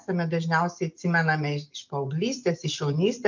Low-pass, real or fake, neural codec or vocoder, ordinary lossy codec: 7.2 kHz; real; none; MP3, 64 kbps